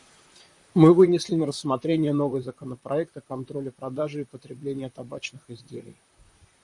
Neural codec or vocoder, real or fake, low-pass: vocoder, 44.1 kHz, 128 mel bands, Pupu-Vocoder; fake; 10.8 kHz